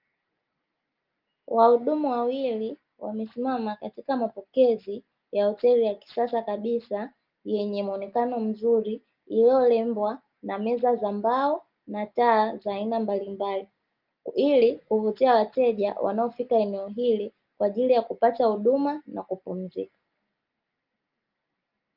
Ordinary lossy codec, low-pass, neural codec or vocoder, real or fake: Opus, 24 kbps; 5.4 kHz; none; real